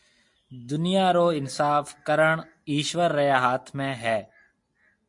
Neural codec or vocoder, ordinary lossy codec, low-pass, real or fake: none; MP3, 48 kbps; 10.8 kHz; real